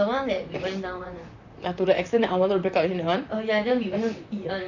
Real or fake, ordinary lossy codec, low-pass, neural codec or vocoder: fake; none; 7.2 kHz; vocoder, 44.1 kHz, 128 mel bands, Pupu-Vocoder